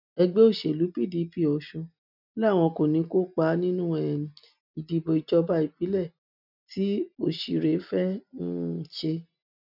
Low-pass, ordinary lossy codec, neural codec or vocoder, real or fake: 5.4 kHz; none; none; real